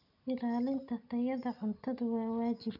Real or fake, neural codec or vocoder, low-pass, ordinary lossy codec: real; none; 5.4 kHz; none